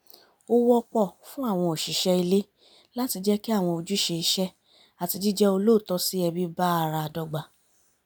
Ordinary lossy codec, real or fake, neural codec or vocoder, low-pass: none; real; none; none